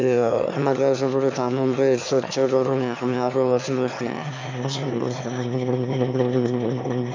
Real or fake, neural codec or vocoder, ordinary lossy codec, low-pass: fake; autoencoder, 22.05 kHz, a latent of 192 numbers a frame, VITS, trained on one speaker; MP3, 64 kbps; 7.2 kHz